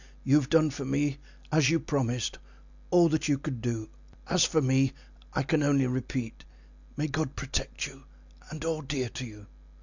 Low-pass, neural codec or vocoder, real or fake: 7.2 kHz; none; real